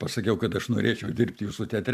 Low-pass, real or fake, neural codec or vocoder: 14.4 kHz; fake; vocoder, 44.1 kHz, 128 mel bands every 256 samples, BigVGAN v2